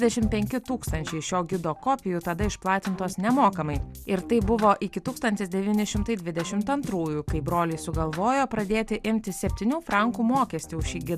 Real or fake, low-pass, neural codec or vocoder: real; 14.4 kHz; none